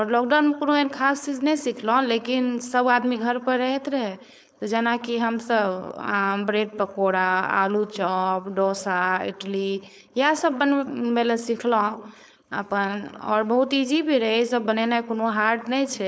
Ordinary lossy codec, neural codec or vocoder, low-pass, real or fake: none; codec, 16 kHz, 4.8 kbps, FACodec; none; fake